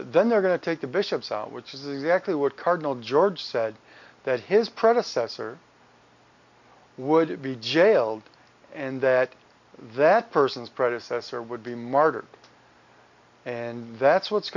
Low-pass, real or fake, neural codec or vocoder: 7.2 kHz; real; none